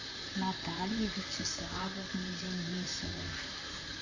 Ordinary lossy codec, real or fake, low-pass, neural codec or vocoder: AAC, 48 kbps; fake; 7.2 kHz; vocoder, 44.1 kHz, 128 mel bands, Pupu-Vocoder